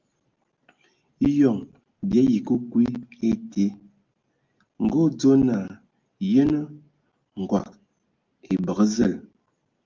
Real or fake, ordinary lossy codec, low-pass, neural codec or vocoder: real; Opus, 32 kbps; 7.2 kHz; none